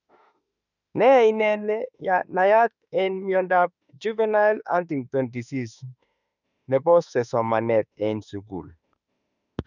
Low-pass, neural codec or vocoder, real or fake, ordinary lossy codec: 7.2 kHz; autoencoder, 48 kHz, 32 numbers a frame, DAC-VAE, trained on Japanese speech; fake; none